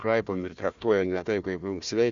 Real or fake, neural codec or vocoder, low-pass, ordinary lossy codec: fake; codec, 16 kHz, 1 kbps, FunCodec, trained on Chinese and English, 50 frames a second; 7.2 kHz; Opus, 64 kbps